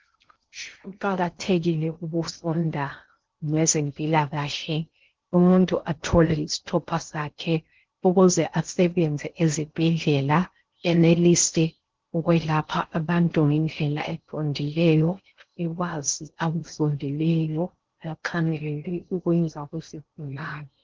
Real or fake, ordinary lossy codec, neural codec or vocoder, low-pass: fake; Opus, 16 kbps; codec, 16 kHz in and 24 kHz out, 0.6 kbps, FocalCodec, streaming, 2048 codes; 7.2 kHz